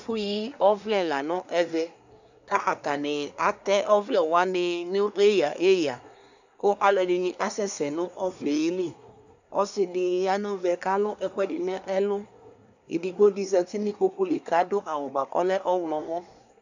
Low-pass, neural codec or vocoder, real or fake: 7.2 kHz; codec, 24 kHz, 1 kbps, SNAC; fake